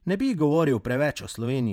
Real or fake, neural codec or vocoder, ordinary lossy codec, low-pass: fake; vocoder, 48 kHz, 128 mel bands, Vocos; none; 19.8 kHz